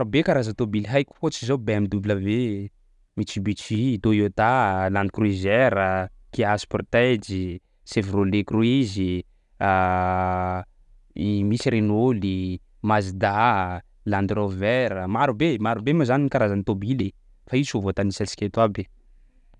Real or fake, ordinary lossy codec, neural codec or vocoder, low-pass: real; none; none; 10.8 kHz